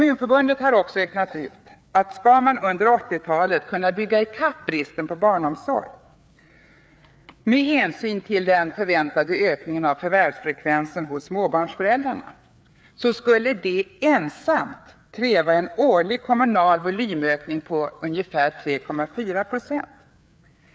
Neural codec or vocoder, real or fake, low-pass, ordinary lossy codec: codec, 16 kHz, 4 kbps, FreqCodec, larger model; fake; none; none